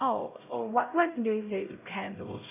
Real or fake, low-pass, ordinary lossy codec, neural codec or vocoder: fake; 3.6 kHz; none; codec, 16 kHz, 0.5 kbps, X-Codec, HuBERT features, trained on LibriSpeech